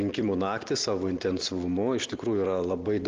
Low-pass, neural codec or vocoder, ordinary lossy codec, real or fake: 7.2 kHz; none; Opus, 16 kbps; real